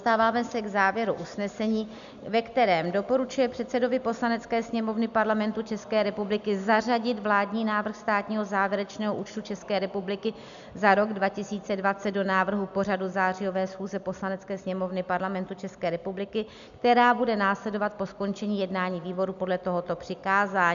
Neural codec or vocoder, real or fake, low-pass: none; real; 7.2 kHz